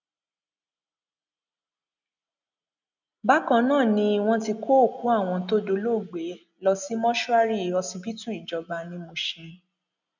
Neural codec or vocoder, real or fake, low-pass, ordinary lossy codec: none; real; 7.2 kHz; none